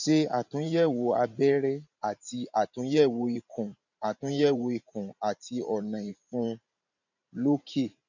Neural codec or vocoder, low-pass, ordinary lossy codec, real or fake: vocoder, 44.1 kHz, 128 mel bands every 512 samples, BigVGAN v2; 7.2 kHz; none; fake